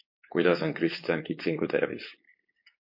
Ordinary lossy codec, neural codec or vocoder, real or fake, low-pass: MP3, 32 kbps; codec, 16 kHz, 4.8 kbps, FACodec; fake; 5.4 kHz